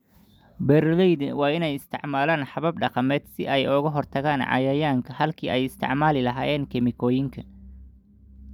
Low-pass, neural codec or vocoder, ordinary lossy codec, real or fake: 19.8 kHz; none; none; real